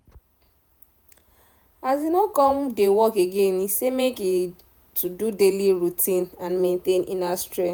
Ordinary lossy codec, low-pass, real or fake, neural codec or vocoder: none; none; fake; vocoder, 48 kHz, 128 mel bands, Vocos